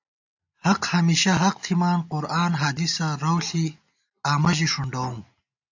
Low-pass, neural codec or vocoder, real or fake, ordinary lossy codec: 7.2 kHz; none; real; AAC, 48 kbps